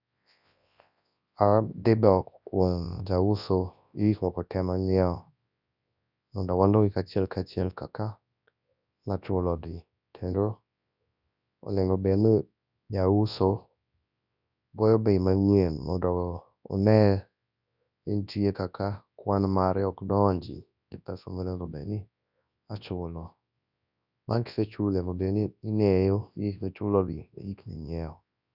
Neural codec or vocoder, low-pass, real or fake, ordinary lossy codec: codec, 24 kHz, 0.9 kbps, WavTokenizer, large speech release; 5.4 kHz; fake; none